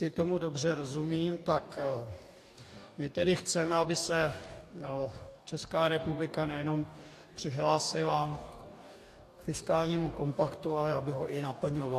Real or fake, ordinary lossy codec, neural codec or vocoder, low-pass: fake; AAC, 64 kbps; codec, 44.1 kHz, 2.6 kbps, DAC; 14.4 kHz